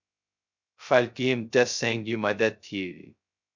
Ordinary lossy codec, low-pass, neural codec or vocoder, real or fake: MP3, 64 kbps; 7.2 kHz; codec, 16 kHz, 0.2 kbps, FocalCodec; fake